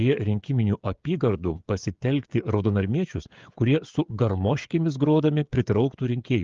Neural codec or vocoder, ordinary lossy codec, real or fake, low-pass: codec, 16 kHz, 16 kbps, FreqCodec, smaller model; Opus, 32 kbps; fake; 7.2 kHz